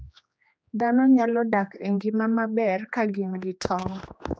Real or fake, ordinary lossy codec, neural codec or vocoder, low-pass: fake; none; codec, 16 kHz, 2 kbps, X-Codec, HuBERT features, trained on general audio; none